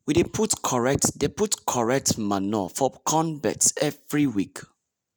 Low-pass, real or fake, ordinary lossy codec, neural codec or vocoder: none; real; none; none